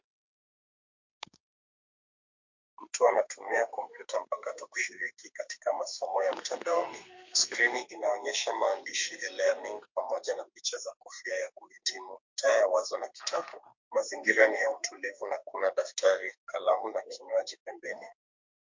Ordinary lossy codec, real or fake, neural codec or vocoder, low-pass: MP3, 48 kbps; fake; codec, 32 kHz, 1.9 kbps, SNAC; 7.2 kHz